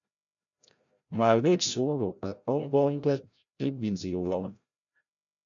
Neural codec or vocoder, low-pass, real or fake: codec, 16 kHz, 0.5 kbps, FreqCodec, larger model; 7.2 kHz; fake